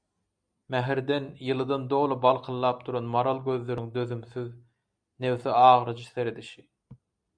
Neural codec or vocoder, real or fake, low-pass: none; real; 9.9 kHz